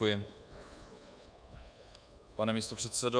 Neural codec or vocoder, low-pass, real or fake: codec, 24 kHz, 1.2 kbps, DualCodec; 9.9 kHz; fake